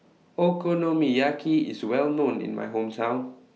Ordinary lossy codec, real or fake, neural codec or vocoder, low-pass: none; real; none; none